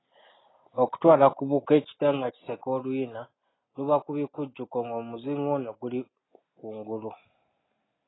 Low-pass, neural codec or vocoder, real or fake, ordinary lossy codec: 7.2 kHz; none; real; AAC, 16 kbps